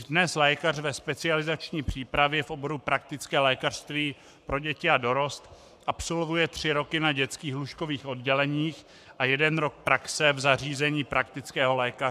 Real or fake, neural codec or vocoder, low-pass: fake; codec, 44.1 kHz, 7.8 kbps, Pupu-Codec; 14.4 kHz